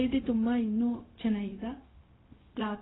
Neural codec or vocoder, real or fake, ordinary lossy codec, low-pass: codec, 16 kHz, 0.4 kbps, LongCat-Audio-Codec; fake; AAC, 16 kbps; 7.2 kHz